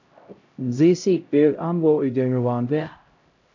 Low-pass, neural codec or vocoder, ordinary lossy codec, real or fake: 7.2 kHz; codec, 16 kHz, 0.5 kbps, X-Codec, HuBERT features, trained on LibriSpeech; AAC, 48 kbps; fake